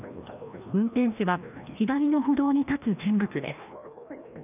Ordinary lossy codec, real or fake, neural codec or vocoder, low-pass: none; fake; codec, 16 kHz, 1 kbps, FreqCodec, larger model; 3.6 kHz